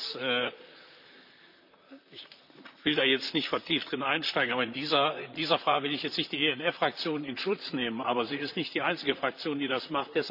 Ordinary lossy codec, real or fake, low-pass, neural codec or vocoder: none; fake; 5.4 kHz; vocoder, 44.1 kHz, 128 mel bands, Pupu-Vocoder